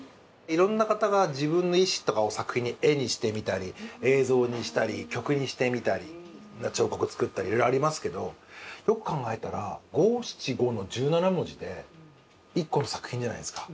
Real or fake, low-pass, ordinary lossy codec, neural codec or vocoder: real; none; none; none